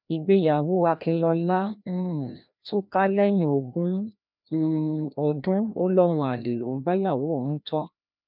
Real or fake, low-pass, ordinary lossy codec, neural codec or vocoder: fake; 5.4 kHz; none; codec, 16 kHz, 1 kbps, FreqCodec, larger model